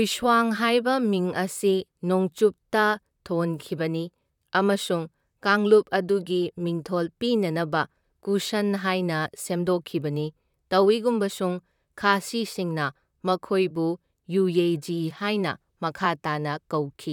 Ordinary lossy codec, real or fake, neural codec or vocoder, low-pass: none; fake; vocoder, 44.1 kHz, 128 mel bands, Pupu-Vocoder; 19.8 kHz